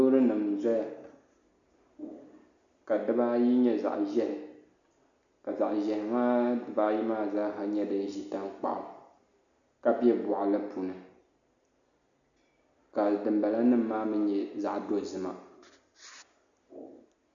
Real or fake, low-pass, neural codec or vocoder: real; 7.2 kHz; none